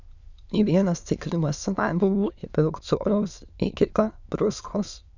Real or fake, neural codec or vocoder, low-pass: fake; autoencoder, 22.05 kHz, a latent of 192 numbers a frame, VITS, trained on many speakers; 7.2 kHz